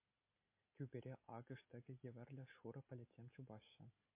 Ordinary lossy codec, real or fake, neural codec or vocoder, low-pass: MP3, 24 kbps; real; none; 3.6 kHz